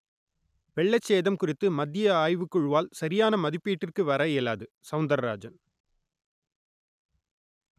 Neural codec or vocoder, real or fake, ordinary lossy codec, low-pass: none; real; none; 14.4 kHz